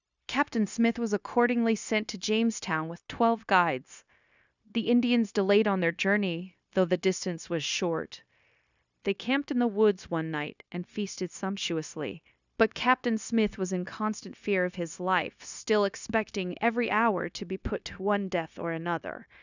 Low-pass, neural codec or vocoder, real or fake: 7.2 kHz; codec, 16 kHz, 0.9 kbps, LongCat-Audio-Codec; fake